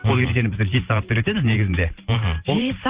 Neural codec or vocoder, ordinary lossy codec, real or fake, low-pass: vocoder, 44.1 kHz, 128 mel bands every 512 samples, BigVGAN v2; Opus, 24 kbps; fake; 3.6 kHz